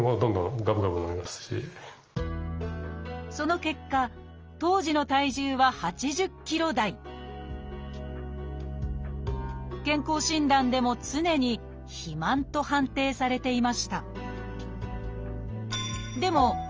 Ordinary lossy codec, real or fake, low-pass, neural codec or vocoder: Opus, 24 kbps; real; 7.2 kHz; none